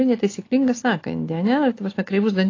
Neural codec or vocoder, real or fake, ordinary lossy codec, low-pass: none; real; AAC, 32 kbps; 7.2 kHz